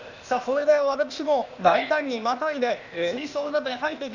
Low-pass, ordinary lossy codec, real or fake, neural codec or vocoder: 7.2 kHz; none; fake; codec, 16 kHz, 0.8 kbps, ZipCodec